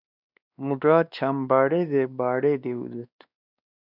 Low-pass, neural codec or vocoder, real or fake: 5.4 kHz; codec, 16 kHz, 2 kbps, X-Codec, WavLM features, trained on Multilingual LibriSpeech; fake